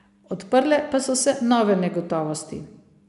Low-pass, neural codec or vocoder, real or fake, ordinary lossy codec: 10.8 kHz; none; real; none